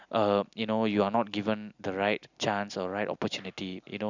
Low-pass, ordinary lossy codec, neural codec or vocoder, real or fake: 7.2 kHz; none; none; real